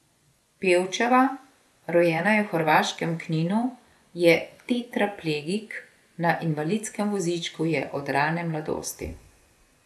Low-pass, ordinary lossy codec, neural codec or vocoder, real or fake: none; none; vocoder, 24 kHz, 100 mel bands, Vocos; fake